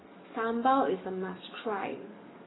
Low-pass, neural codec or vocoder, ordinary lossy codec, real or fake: 7.2 kHz; codec, 44.1 kHz, 7.8 kbps, DAC; AAC, 16 kbps; fake